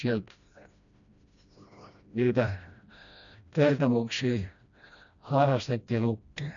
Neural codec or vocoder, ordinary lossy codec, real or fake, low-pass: codec, 16 kHz, 1 kbps, FreqCodec, smaller model; MP3, 96 kbps; fake; 7.2 kHz